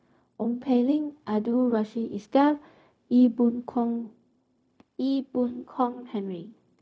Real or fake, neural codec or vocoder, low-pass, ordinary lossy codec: fake; codec, 16 kHz, 0.4 kbps, LongCat-Audio-Codec; none; none